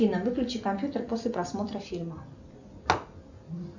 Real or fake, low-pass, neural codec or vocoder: real; 7.2 kHz; none